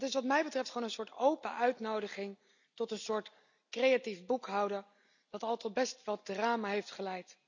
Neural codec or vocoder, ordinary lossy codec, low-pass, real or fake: none; none; 7.2 kHz; real